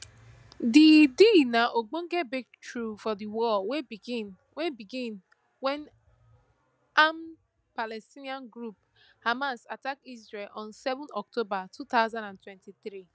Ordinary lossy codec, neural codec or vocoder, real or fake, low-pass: none; none; real; none